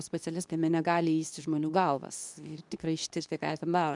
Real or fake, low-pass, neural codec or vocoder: fake; 10.8 kHz; codec, 24 kHz, 0.9 kbps, WavTokenizer, medium speech release version 2